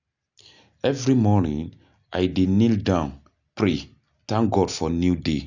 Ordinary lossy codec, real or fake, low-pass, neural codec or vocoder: none; real; 7.2 kHz; none